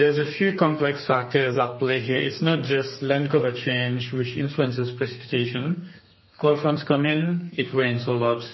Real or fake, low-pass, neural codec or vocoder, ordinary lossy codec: fake; 7.2 kHz; codec, 32 kHz, 1.9 kbps, SNAC; MP3, 24 kbps